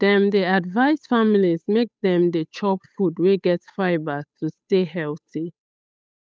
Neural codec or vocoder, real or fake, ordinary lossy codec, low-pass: codec, 16 kHz, 8 kbps, FunCodec, trained on Chinese and English, 25 frames a second; fake; none; none